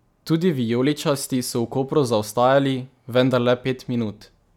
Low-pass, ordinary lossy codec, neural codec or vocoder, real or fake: 19.8 kHz; none; none; real